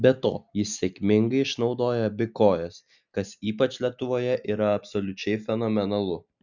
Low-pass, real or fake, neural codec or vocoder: 7.2 kHz; real; none